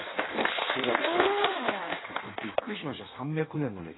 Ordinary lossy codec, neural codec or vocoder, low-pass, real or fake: AAC, 16 kbps; codec, 16 kHz in and 24 kHz out, 1.1 kbps, FireRedTTS-2 codec; 7.2 kHz; fake